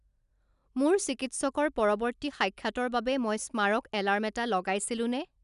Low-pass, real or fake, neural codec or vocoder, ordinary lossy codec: 10.8 kHz; real; none; none